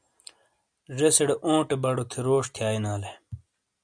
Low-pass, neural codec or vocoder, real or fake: 9.9 kHz; none; real